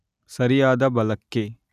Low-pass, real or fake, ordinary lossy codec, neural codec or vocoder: 14.4 kHz; real; none; none